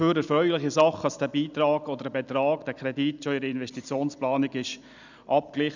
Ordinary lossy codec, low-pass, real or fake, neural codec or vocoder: none; 7.2 kHz; real; none